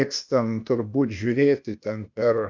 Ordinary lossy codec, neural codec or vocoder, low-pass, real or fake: MP3, 64 kbps; codec, 16 kHz, 0.8 kbps, ZipCodec; 7.2 kHz; fake